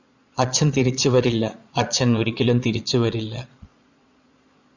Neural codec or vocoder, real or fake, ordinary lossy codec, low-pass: vocoder, 22.05 kHz, 80 mel bands, Vocos; fake; Opus, 64 kbps; 7.2 kHz